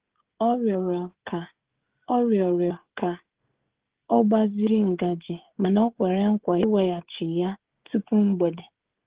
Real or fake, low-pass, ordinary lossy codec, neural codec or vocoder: fake; 3.6 kHz; Opus, 16 kbps; codec, 16 kHz, 16 kbps, FreqCodec, smaller model